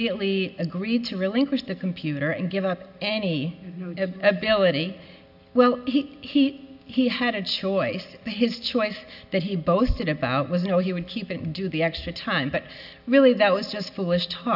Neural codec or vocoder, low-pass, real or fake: none; 5.4 kHz; real